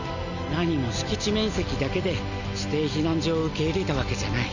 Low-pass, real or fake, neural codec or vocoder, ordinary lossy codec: 7.2 kHz; real; none; none